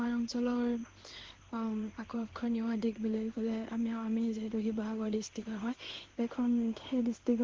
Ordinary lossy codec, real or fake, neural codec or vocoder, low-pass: Opus, 16 kbps; fake; codec, 16 kHz in and 24 kHz out, 1 kbps, XY-Tokenizer; 7.2 kHz